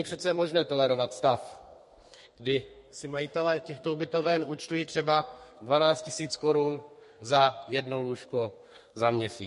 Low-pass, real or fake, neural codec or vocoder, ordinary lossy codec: 14.4 kHz; fake; codec, 32 kHz, 1.9 kbps, SNAC; MP3, 48 kbps